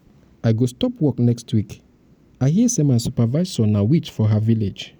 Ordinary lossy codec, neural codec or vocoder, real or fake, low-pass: none; none; real; none